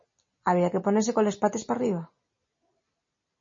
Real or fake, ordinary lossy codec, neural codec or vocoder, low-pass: real; MP3, 32 kbps; none; 7.2 kHz